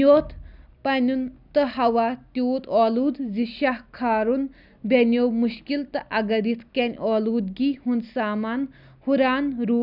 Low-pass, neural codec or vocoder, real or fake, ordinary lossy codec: 5.4 kHz; none; real; none